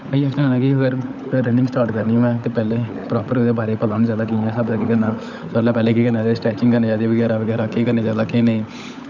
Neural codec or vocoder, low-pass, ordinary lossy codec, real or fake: codec, 16 kHz, 16 kbps, FunCodec, trained on LibriTTS, 50 frames a second; 7.2 kHz; none; fake